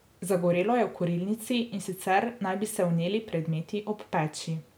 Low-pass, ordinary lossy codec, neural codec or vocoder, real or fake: none; none; none; real